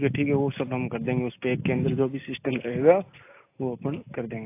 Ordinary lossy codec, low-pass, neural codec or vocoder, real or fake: AAC, 24 kbps; 3.6 kHz; none; real